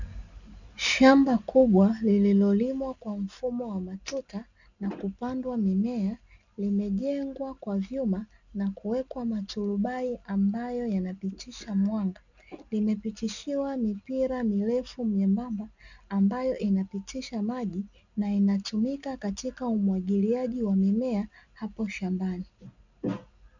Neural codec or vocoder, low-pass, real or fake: none; 7.2 kHz; real